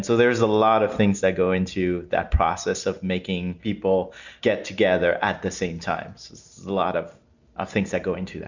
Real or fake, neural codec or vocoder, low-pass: real; none; 7.2 kHz